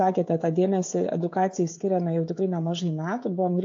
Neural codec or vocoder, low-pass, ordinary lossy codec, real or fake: codec, 16 kHz, 16 kbps, FreqCodec, smaller model; 7.2 kHz; AAC, 48 kbps; fake